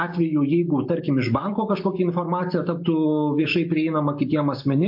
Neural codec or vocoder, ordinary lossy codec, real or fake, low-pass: none; MP3, 32 kbps; real; 5.4 kHz